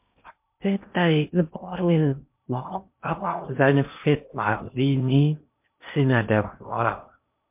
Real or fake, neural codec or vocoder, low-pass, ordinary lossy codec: fake; codec, 16 kHz in and 24 kHz out, 0.6 kbps, FocalCodec, streaming, 2048 codes; 3.6 kHz; MP3, 32 kbps